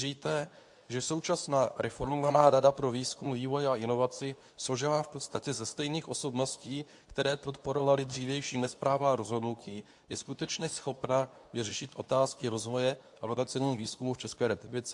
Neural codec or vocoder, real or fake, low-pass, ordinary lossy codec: codec, 24 kHz, 0.9 kbps, WavTokenizer, medium speech release version 2; fake; 10.8 kHz; AAC, 64 kbps